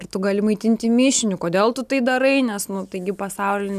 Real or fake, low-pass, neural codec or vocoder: real; 14.4 kHz; none